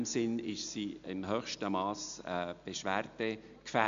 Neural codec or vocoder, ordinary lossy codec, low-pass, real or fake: none; MP3, 64 kbps; 7.2 kHz; real